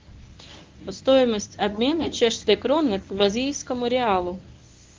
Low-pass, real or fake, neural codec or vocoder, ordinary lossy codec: 7.2 kHz; fake; codec, 24 kHz, 0.9 kbps, WavTokenizer, medium speech release version 1; Opus, 32 kbps